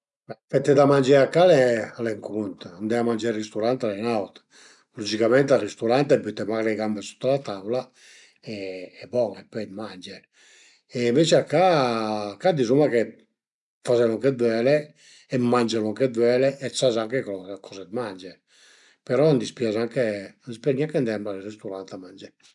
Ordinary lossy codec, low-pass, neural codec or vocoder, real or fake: MP3, 96 kbps; 10.8 kHz; none; real